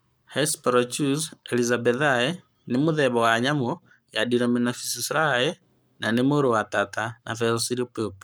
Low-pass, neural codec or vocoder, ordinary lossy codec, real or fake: none; codec, 44.1 kHz, 7.8 kbps, Pupu-Codec; none; fake